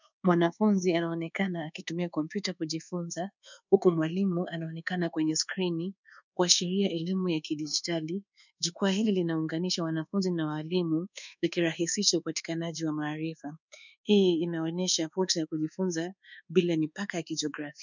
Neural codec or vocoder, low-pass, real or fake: codec, 24 kHz, 1.2 kbps, DualCodec; 7.2 kHz; fake